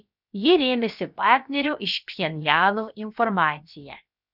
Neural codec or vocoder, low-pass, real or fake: codec, 16 kHz, about 1 kbps, DyCAST, with the encoder's durations; 5.4 kHz; fake